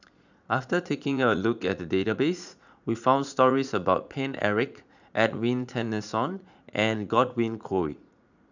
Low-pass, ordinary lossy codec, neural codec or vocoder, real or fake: 7.2 kHz; none; vocoder, 44.1 kHz, 80 mel bands, Vocos; fake